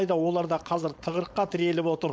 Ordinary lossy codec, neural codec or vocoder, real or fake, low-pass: none; codec, 16 kHz, 4.8 kbps, FACodec; fake; none